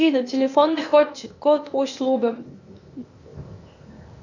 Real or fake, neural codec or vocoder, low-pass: fake; codec, 16 kHz, 0.8 kbps, ZipCodec; 7.2 kHz